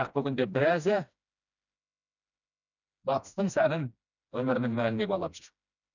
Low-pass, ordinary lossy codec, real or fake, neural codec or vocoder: 7.2 kHz; none; fake; codec, 16 kHz, 1 kbps, FreqCodec, smaller model